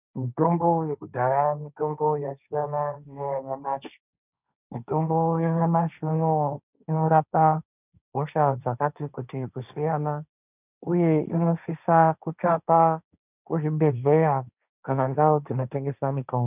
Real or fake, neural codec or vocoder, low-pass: fake; codec, 16 kHz, 1.1 kbps, Voila-Tokenizer; 3.6 kHz